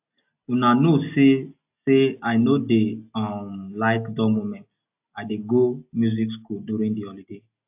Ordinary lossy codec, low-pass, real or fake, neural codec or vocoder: AAC, 32 kbps; 3.6 kHz; real; none